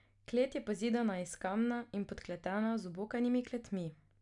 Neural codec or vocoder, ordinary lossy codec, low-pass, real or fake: none; none; 10.8 kHz; real